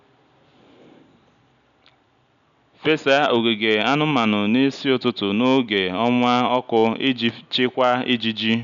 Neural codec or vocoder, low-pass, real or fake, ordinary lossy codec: none; 7.2 kHz; real; none